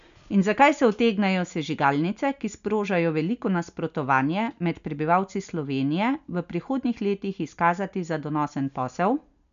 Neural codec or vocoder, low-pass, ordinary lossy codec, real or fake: none; 7.2 kHz; AAC, 96 kbps; real